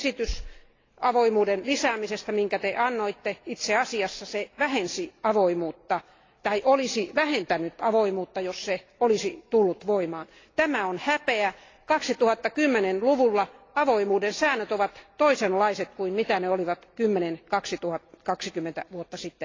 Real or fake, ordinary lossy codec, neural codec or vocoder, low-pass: real; AAC, 32 kbps; none; 7.2 kHz